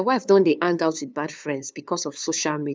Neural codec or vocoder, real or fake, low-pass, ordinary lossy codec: codec, 16 kHz, 2 kbps, FunCodec, trained on LibriTTS, 25 frames a second; fake; none; none